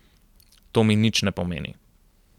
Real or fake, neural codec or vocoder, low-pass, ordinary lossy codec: real; none; 19.8 kHz; none